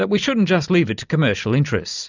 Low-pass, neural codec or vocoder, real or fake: 7.2 kHz; none; real